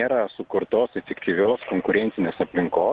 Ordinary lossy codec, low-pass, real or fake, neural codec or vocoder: Opus, 16 kbps; 9.9 kHz; real; none